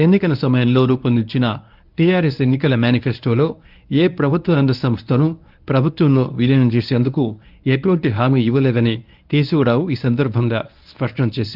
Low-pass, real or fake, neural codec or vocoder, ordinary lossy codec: 5.4 kHz; fake; codec, 24 kHz, 0.9 kbps, WavTokenizer, medium speech release version 1; Opus, 24 kbps